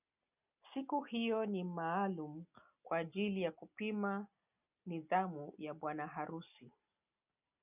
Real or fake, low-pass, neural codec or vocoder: real; 3.6 kHz; none